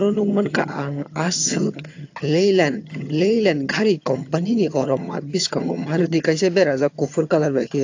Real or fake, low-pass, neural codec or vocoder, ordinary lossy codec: fake; 7.2 kHz; vocoder, 22.05 kHz, 80 mel bands, HiFi-GAN; AAC, 48 kbps